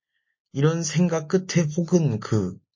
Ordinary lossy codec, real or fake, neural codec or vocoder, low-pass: MP3, 32 kbps; real; none; 7.2 kHz